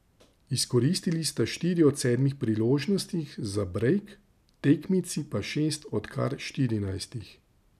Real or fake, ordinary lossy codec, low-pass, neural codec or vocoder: real; none; 14.4 kHz; none